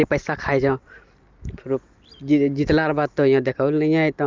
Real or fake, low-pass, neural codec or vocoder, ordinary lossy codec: real; 7.2 kHz; none; Opus, 32 kbps